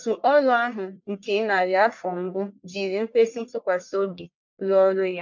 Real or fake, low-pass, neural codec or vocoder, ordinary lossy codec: fake; 7.2 kHz; codec, 44.1 kHz, 1.7 kbps, Pupu-Codec; MP3, 64 kbps